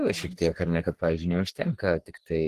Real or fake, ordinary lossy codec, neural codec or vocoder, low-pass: fake; Opus, 16 kbps; codec, 44.1 kHz, 3.4 kbps, Pupu-Codec; 14.4 kHz